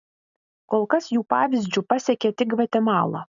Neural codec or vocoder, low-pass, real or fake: none; 7.2 kHz; real